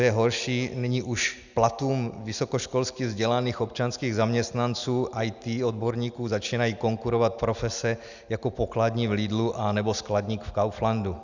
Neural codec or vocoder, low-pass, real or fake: none; 7.2 kHz; real